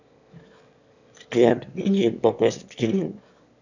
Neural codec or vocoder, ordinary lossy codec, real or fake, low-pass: autoencoder, 22.05 kHz, a latent of 192 numbers a frame, VITS, trained on one speaker; none; fake; 7.2 kHz